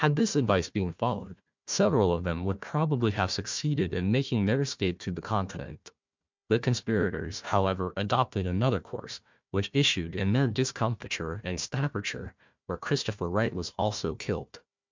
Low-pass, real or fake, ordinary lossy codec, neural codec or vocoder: 7.2 kHz; fake; MP3, 64 kbps; codec, 16 kHz, 1 kbps, FunCodec, trained on Chinese and English, 50 frames a second